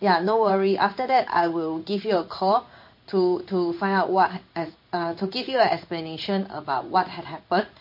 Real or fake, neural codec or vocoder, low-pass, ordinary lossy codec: fake; codec, 16 kHz in and 24 kHz out, 1 kbps, XY-Tokenizer; 5.4 kHz; MP3, 32 kbps